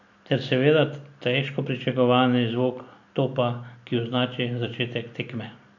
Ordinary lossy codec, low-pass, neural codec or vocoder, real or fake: none; 7.2 kHz; none; real